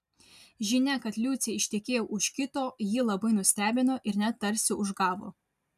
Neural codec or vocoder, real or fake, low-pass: none; real; 14.4 kHz